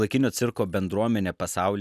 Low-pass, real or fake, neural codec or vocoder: 14.4 kHz; real; none